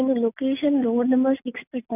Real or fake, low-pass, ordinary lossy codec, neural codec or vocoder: real; 3.6 kHz; none; none